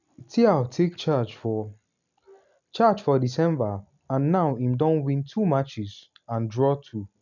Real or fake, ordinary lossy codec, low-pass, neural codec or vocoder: real; none; 7.2 kHz; none